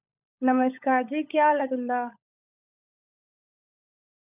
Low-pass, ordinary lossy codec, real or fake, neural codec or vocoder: 3.6 kHz; none; fake; codec, 16 kHz, 16 kbps, FunCodec, trained on LibriTTS, 50 frames a second